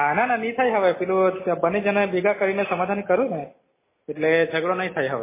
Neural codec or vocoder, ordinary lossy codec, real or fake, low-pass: none; MP3, 16 kbps; real; 3.6 kHz